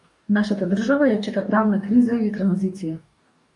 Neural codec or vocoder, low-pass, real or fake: codec, 44.1 kHz, 2.6 kbps, DAC; 10.8 kHz; fake